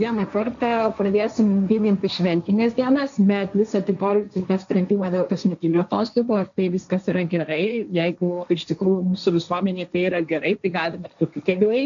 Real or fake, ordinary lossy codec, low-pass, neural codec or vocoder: fake; MP3, 96 kbps; 7.2 kHz; codec, 16 kHz, 1.1 kbps, Voila-Tokenizer